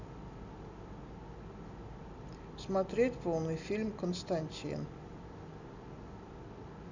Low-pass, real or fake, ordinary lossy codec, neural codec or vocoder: 7.2 kHz; real; none; none